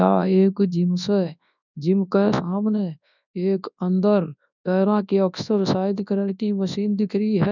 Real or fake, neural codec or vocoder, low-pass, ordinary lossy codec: fake; codec, 24 kHz, 0.9 kbps, WavTokenizer, large speech release; 7.2 kHz; none